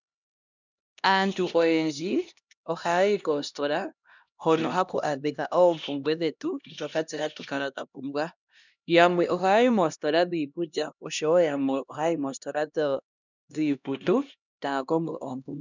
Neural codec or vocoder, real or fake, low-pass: codec, 16 kHz, 1 kbps, X-Codec, HuBERT features, trained on LibriSpeech; fake; 7.2 kHz